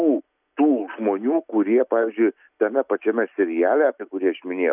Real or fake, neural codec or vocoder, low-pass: real; none; 3.6 kHz